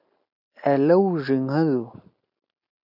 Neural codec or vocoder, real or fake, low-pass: none; real; 5.4 kHz